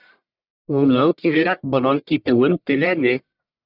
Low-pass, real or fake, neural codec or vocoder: 5.4 kHz; fake; codec, 44.1 kHz, 1.7 kbps, Pupu-Codec